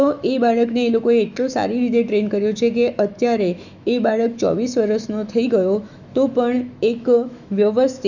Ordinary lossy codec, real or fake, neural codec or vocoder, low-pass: none; fake; autoencoder, 48 kHz, 128 numbers a frame, DAC-VAE, trained on Japanese speech; 7.2 kHz